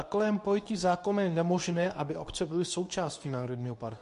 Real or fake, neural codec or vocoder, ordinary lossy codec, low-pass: fake; codec, 24 kHz, 0.9 kbps, WavTokenizer, medium speech release version 2; MP3, 64 kbps; 10.8 kHz